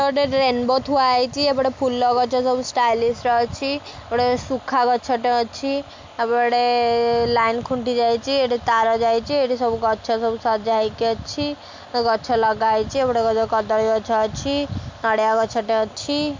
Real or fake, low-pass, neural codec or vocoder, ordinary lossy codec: real; 7.2 kHz; none; MP3, 64 kbps